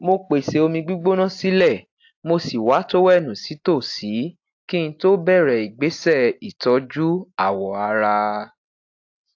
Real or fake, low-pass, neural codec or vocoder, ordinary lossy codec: real; 7.2 kHz; none; AAC, 48 kbps